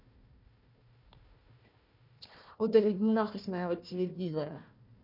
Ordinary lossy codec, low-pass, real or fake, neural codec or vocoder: none; 5.4 kHz; fake; codec, 16 kHz, 1 kbps, FunCodec, trained on Chinese and English, 50 frames a second